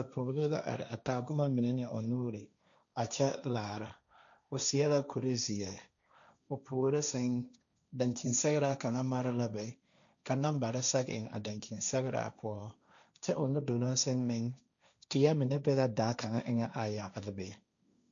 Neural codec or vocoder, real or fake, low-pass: codec, 16 kHz, 1.1 kbps, Voila-Tokenizer; fake; 7.2 kHz